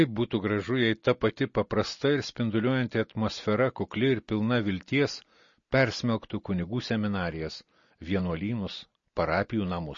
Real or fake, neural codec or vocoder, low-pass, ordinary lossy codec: real; none; 7.2 kHz; MP3, 32 kbps